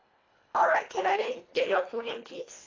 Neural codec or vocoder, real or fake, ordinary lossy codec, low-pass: codec, 24 kHz, 1.5 kbps, HILCodec; fake; AAC, 32 kbps; 7.2 kHz